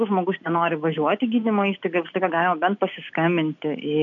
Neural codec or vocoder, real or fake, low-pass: none; real; 7.2 kHz